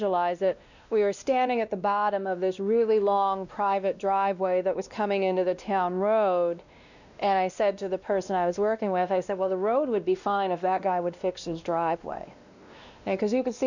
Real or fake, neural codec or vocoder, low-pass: fake; codec, 16 kHz, 1 kbps, X-Codec, WavLM features, trained on Multilingual LibriSpeech; 7.2 kHz